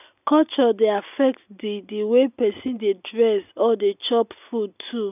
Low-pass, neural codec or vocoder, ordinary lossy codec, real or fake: 3.6 kHz; none; none; real